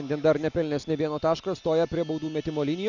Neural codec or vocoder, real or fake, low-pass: none; real; 7.2 kHz